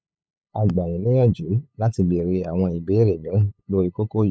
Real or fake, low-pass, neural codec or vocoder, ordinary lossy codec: fake; none; codec, 16 kHz, 8 kbps, FunCodec, trained on LibriTTS, 25 frames a second; none